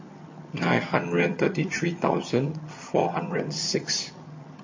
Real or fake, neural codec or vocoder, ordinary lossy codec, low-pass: fake; vocoder, 22.05 kHz, 80 mel bands, HiFi-GAN; MP3, 32 kbps; 7.2 kHz